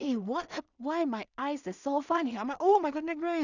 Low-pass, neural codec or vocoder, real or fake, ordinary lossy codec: 7.2 kHz; codec, 16 kHz in and 24 kHz out, 0.4 kbps, LongCat-Audio-Codec, two codebook decoder; fake; none